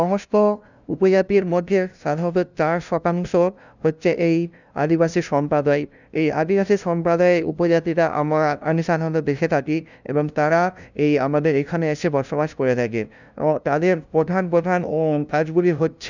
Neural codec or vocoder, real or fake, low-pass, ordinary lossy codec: codec, 16 kHz, 0.5 kbps, FunCodec, trained on LibriTTS, 25 frames a second; fake; 7.2 kHz; none